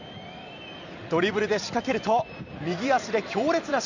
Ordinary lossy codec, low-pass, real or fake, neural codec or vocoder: none; 7.2 kHz; fake; vocoder, 44.1 kHz, 128 mel bands every 256 samples, BigVGAN v2